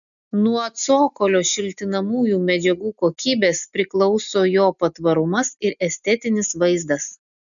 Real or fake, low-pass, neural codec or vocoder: real; 7.2 kHz; none